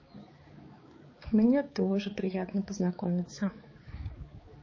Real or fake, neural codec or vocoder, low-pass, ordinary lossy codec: fake; codec, 16 kHz, 4 kbps, X-Codec, HuBERT features, trained on balanced general audio; 7.2 kHz; MP3, 32 kbps